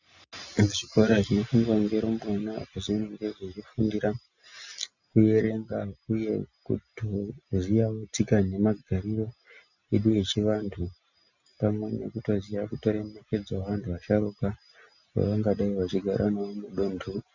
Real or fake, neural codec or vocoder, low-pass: real; none; 7.2 kHz